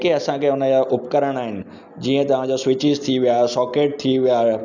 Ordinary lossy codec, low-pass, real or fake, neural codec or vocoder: none; 7.2 kHz; real; none